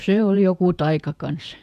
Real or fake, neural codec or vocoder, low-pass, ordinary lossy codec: fake; vocoder, 48 kHz, 128 mel bands, Vocos; 14.4 kHz; none